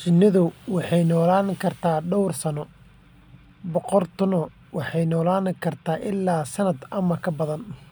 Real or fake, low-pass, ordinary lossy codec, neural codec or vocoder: real; none; none; none